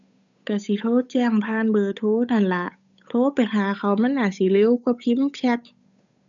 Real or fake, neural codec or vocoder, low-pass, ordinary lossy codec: fake; codec, 16 kHz, 8 kbps, FunCodec, trained on Chinese and English, 25 frames a second; 7.2 kHz; none